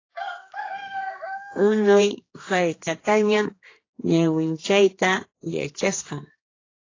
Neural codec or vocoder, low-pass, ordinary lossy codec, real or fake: codec, 16 kHz, 2 kbps, X-Codec, HuBERT features, trained on general audio; 7.2 kHz; AAC, 32 kbps; fake